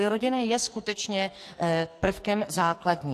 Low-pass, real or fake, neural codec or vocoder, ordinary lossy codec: 14.4 kHz; fake; codec, 44.1 kHz, 2.6 kbps, SNAC; AAC, 64 kbps